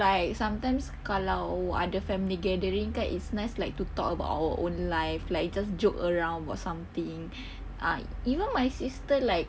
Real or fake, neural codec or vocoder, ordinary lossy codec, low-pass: real; none; none; none